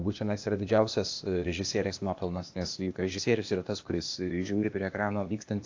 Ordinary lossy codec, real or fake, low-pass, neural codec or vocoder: AAC, 48 kbps; fake; 7.2 kHz; codec, 16 kHz, 0.8 kbps, ZipCodec